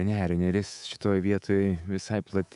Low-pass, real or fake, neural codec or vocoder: 10.8 kHz; fake; codec, 24 kHz, 3.1 kbps, DualCodec